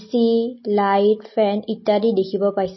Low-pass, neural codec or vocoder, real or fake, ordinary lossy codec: 7.2 kHz; none; real; MP3, 24 kbps